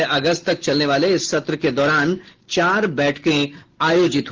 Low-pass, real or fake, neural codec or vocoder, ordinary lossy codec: 7.2 kHz; real; none; Opus, 16 kbps